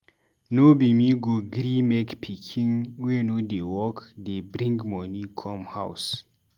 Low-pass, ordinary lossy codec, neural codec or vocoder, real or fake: 19.8 kHz; Opus, 32 kbps; none; real